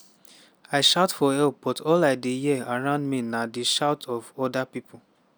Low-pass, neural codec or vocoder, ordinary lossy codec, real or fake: none; none; none; real